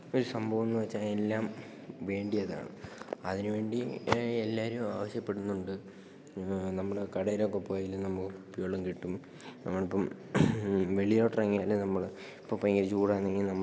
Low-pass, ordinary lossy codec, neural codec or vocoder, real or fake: none; none; none; real